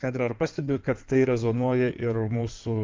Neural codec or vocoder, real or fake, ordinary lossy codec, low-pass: codec, 16 kHz in and 24 kHz out, 2.2 kbps, FireRedTTS-2 codec; fake; Opus, 32 kbps; 7.2 kHz